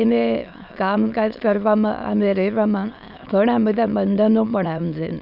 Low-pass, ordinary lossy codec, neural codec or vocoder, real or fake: 5.4 kHz; none; autoencoder, 22.05 kHz, a latent of 192 numbers a frame, VITS, trained on many speakers; fake